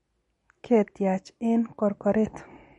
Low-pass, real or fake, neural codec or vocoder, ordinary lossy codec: 10.8 kHz; real; none; MP3, 48 kbps